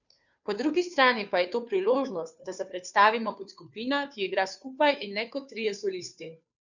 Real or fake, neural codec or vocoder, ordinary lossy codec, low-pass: fake; codec, 16 kHz, 2 kbps, FunCodec, trained on Chinese and English, 25 frames a second; none; 7.2 kHz